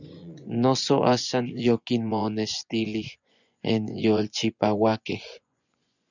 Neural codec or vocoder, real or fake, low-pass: vocoder, 24 kHz, 100 mel bands, Vocos; fake; 7.2 kHz